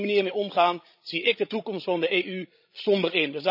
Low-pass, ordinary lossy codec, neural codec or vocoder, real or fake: 5.4 kHz; none; codec, 16 kHz, 16 kbps, FreqCodec, larger model; fake